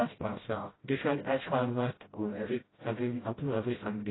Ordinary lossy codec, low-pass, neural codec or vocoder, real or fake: AAC, 16 kbps; 7.2 kHz; codec, 16 kHz, 0.5 kbps, FreqCodec, smaller model; fake